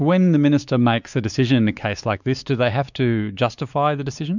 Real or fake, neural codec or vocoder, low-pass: fake; codec, 16 kHz, 4 kbps, X-Codec, WavLM features, trained on Multilingual LibriSpeech; 7.2 kHz